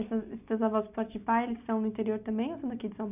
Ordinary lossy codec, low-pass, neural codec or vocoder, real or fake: none; 3.6 kHz; none; real